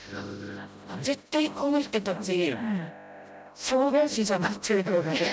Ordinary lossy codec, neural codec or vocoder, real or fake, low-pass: none; codec, 16 kHz, 0.5 kbps, FreqCodec, smaller model; fake; none